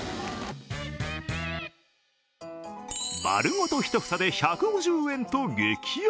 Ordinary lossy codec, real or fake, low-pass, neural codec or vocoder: none; real; none; none